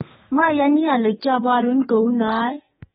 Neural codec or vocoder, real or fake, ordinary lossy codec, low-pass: codec, 32 kHz, 1.9 kbps, SNAC; fake; AAC, 16 kbps; 14.4 kHz